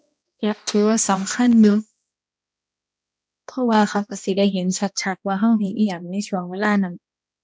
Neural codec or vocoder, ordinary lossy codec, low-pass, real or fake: codec, 16 kHz, 1 kbps, X-Codec, HuBERT features, trained on balanced general audio; none; none; fake